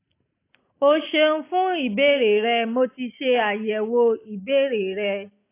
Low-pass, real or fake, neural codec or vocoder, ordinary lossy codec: 3.6 kHz; real; none; AAC, 24 kbps